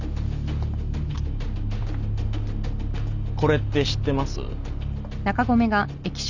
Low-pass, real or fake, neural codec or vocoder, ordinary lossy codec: 7.2 kHz; real; none; none